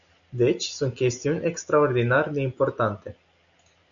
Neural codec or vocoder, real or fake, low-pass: none; real; 7.2 kHz